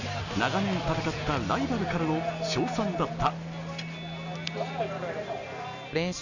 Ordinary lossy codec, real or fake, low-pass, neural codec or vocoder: none; real; 7.2 kHz; none